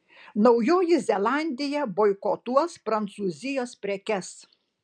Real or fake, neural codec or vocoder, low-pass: real; none; 9.9 kHz